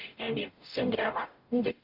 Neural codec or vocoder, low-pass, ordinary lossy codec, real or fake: codec, 44.1 kHz, 0.9 kbps, DAC; 5.4 kHz; Opus, 24 kbps; fake